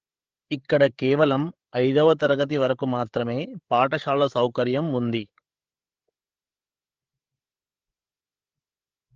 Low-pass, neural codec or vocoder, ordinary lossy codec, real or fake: 7.2 kHz; codec, 16 kHz, 8 kbps, FreqCodec, larger model; Opus, 16 kbps; fake